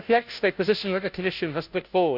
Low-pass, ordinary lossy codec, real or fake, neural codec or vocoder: 5.4 kHz; none; fake; codec, 16 kHz, 0.5 kbps, FunCodec, trained on Chinese and English, 25 frames a second